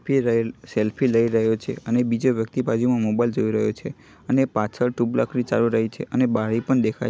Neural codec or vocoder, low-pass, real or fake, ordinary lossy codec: none; none; real; none